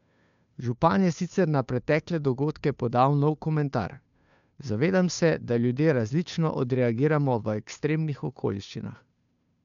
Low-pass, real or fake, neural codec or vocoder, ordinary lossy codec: 7.2 kHz; fake; codec, 16 kHz, 2 kbps, FunCodec, trained on Chinese and English, 25 frames a second; MP3, 96 kbps